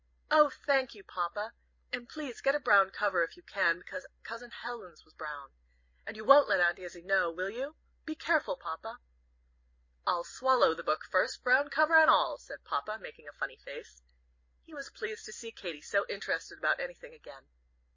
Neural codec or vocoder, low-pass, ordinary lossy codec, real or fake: none; 7.2 kHz; MP3, 32 kbps; real